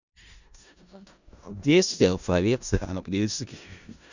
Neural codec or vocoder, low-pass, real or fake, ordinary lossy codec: codec, 16 kHz in and 24 kHz out, 0.4 kbps, LongCat-Audio-Codec, four codebook decoder; 7.2 kHz; fake; none